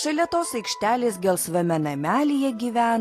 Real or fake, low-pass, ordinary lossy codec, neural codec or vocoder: real; 14.4 kHz; AAC, 48 kbps; none